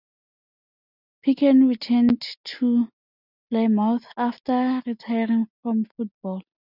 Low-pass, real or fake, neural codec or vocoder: 5.4 kHz; real; none